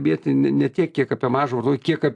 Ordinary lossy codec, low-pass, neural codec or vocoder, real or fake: AAC, 64 kbps; 10.8 kHz; none; real